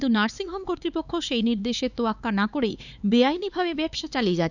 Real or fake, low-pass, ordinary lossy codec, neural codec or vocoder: fake; 7.2 kHz; none; codec, 16 kHz, 4 kbps, X-Codec, HuBERT features, trained on LibriSpeech